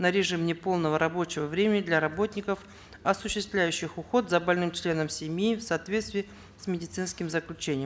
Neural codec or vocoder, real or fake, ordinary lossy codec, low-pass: none; real; none; none